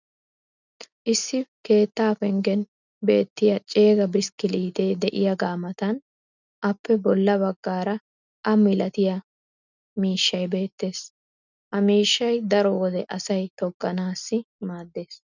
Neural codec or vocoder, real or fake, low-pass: none; real; 7.2 kHz